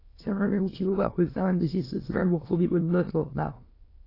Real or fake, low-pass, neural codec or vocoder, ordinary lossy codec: fake; 5.4 kHz; autoencoder, 22.05 kHz, a latent of 192 numbers a frame, VITS, trained on many speakers; AAC, 24 kbps